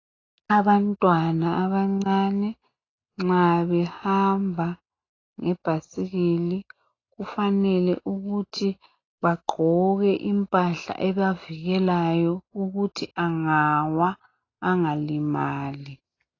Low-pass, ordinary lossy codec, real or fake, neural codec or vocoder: 7.2 kHz; AAC, 32 kbps; real; none